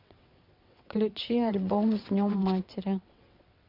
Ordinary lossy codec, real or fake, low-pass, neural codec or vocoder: MP3, 48 kbps; fake; 5.4 kHz; vocoder, 22.05 kHz, 80 mel bands, WaveNeXt